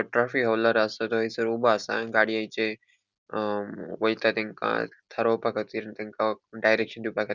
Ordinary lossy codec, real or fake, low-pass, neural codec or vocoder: none; real; 7.2 kHz; none